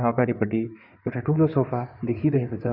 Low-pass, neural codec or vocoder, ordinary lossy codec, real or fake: 5.4 kHz; codec, 16 kHz in and 24 kHz out, 2.2 kbps, FireRedTTS-2 codec; none; fake